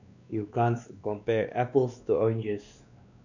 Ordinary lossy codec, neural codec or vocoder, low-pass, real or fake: none; codec, 16 kHz, 2 kbps, X-Codec, WavLM features, trained on Multilingual LibriSpeech; 7.2 kHz; fake